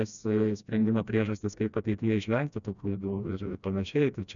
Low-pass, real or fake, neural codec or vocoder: 7.2 kHz; fake; codec, 16 kHz, 1 kbps, FreqCodec, smaller model